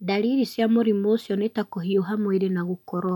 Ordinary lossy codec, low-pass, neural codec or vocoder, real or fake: none; 19.8 kHz; none; real